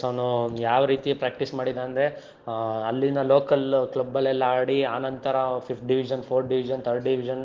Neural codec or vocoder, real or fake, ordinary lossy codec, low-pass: none; real; Opus, 16 kbps; 7.2 kHz